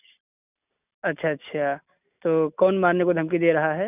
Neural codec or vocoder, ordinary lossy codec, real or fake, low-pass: none; none; real; 3.6 kHz